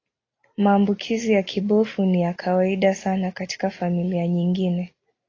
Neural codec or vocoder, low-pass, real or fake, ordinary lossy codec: none; 7.2 kHz; real; AAC, 32 kbps